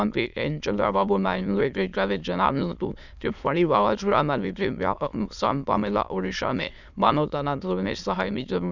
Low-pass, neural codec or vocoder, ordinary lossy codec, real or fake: 7.2 kHz; autoencoder, 22.05 kHz, a latent of 192 numbers a frame, VITS, trained on many speakers; none; fake